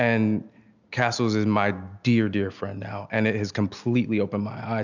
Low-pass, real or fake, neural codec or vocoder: 7.2 kHz; real; none